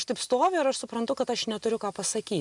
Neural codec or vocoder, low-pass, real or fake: none; 10.8 kHz; real